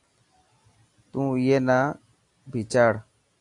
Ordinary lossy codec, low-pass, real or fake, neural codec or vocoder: AAC, 64 kbps; 10.8 kHz; real; none